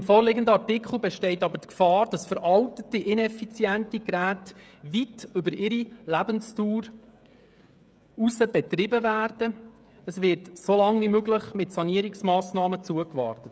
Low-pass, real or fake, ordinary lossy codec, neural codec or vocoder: none; fake; none; codec, 16 kHz, 16 kbps, FreqCodec, smaller model